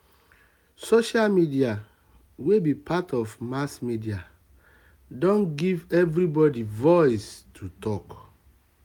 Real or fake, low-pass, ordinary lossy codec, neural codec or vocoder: real; 19.8 kHz; MP3, 96 kbps; none